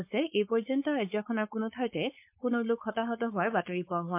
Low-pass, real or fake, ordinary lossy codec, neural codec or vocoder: 3.6 kHz; fake; MP3, 32 kbps; codec, 16 kHz, 4.8 kbps, FACodec